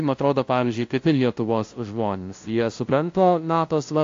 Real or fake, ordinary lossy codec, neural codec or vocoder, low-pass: fake; AAC, 48 kbps; codec, 16 kHz, 0.5 kbps, FunCodec, trained on LibriTTS, 25 frames a second; 7.2 kHz